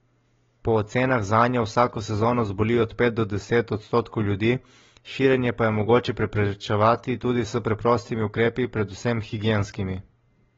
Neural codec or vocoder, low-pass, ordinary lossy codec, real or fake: none; 7.2 kHz; AAC, 24 kbps; real